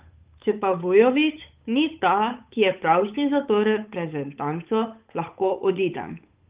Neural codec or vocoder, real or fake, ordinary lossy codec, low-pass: codec, 16 kHz, 8 kbps, FunCodec, trained on Chinese and English, 25 frames a second; fake; Opus, 32 kbps; 3.6 kHz